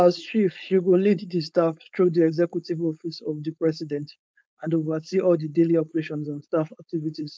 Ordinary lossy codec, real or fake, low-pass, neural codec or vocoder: none; fake; none; codec, 16 kHz, 4.8 kbps, FACodec